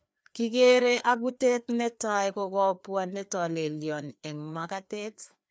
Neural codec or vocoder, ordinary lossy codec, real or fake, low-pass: codec, 16 kHz, 2 kbps, FreqCodec, larger model; none; fake; none